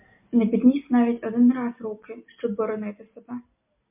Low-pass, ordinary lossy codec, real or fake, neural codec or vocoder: 3.6 kHz; MP3, 32 kbps; real; none